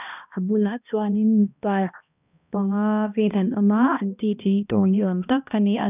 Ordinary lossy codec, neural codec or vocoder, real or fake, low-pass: none; codec, 16 kHz, 1 kbps, X-Codec, HuBERT features, trained on balanced general audio; fake; 3.6 kHz